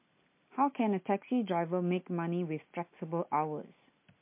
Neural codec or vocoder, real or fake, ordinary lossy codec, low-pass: none; real; MP3, 24 kbps; 3.6 kHz